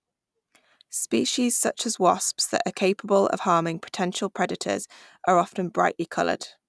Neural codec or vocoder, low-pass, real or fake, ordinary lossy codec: none; none; real; none